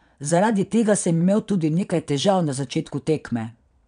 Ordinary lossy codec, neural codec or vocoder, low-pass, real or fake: none; vocoder, 22.05 kHz, 80 mel bands, Vocos; 9.9 kHz; fake